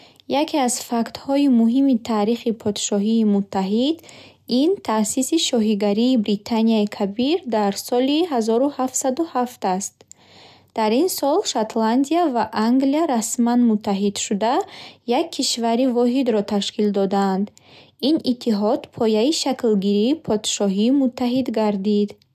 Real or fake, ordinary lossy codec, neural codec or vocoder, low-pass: real; none; none; 14.4 kHz